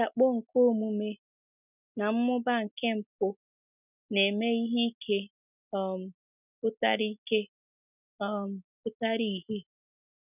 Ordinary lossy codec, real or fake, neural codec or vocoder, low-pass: none; real; none; 3.6 kHz